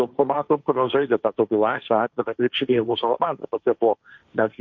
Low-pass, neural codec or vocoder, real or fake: 7.2 kHz; codec, 16 kHz, 1.1 kbps, Voila-Tokenizer; fake